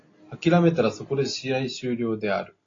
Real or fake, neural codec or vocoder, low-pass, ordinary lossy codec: real; none; 7.2 kHz; AAC, 32 kbps